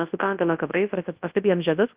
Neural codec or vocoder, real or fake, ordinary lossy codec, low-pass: codec, 24 kHz, 0.9 kbps, WavTokenizer, large speech release; fake; Opus, 24 kbps; 3.6 kHz